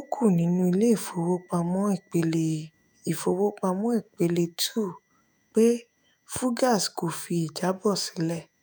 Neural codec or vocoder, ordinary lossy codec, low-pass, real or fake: autoencoder, 48 kHz, 128 numbers a frame, DAC-VAE, trained on Japanese speech; none; none; fake